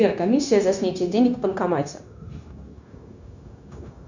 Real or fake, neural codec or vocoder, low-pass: fake; codec, 16 kHz, 0.9 kbps, LongCat-Audio-Codec; 7.2 kHz